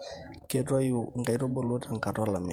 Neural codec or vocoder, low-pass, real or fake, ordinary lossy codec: vocoder, 48 kHz, 128 mel bands, Vocos; 19.8 kHz; fake; MP3, 96 kbps